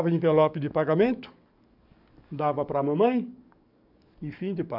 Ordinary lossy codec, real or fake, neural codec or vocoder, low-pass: none; fake; codec, 44.1 kHz, 7.8 kbps, DAC; 5.4 kHz